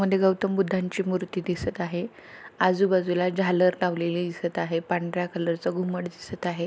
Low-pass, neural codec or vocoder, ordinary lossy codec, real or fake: none; none; none; real